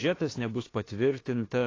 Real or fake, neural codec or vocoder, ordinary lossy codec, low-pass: fake; autoencoder, 48 kHz, 32 numbers a frame, DAC-VAE, trained on Japanese speech; AAC, 32 kbps; 7.2 kHz